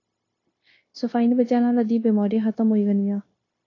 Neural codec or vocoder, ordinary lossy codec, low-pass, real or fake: codec, 16 kHz, 0.9 kbps, LongCat-Audio-Codec; AAC, 32 kbps; 7.2 kHz; fake